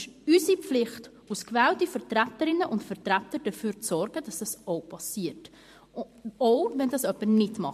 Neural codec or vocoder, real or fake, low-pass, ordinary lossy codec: vocoder, 44.1 kHz, 128 mel bands every 512 samples, BigVGAN v2; fake; 14.4 kHz; MP3, 64 kbps